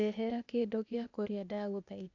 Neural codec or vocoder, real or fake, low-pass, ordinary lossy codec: codec, 16 kHz, 0.8 kbps, ZipCodec; fake; 7.2 kHz; none